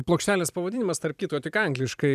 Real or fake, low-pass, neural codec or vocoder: fake; 14.4 kHz; vocoder, 44.1 kHz, 128 mel bands every 256 samples, BigVGAN v2